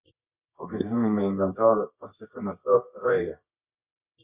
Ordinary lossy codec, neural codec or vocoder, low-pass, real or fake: Opus, 64 kbps; codec, 24 kHz, 0.9 kbps, WavTokenizer, medium music audio release; 3.6 kHz; fake